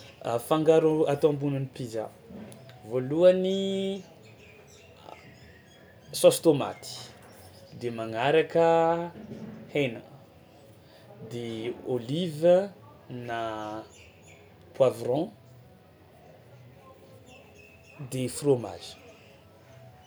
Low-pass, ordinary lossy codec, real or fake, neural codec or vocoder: none; none; real; none